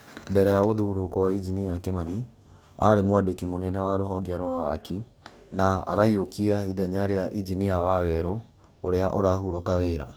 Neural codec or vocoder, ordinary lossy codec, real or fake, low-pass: codec, 44.1 kHz, 2.6 kbps, DAC; none; fake; none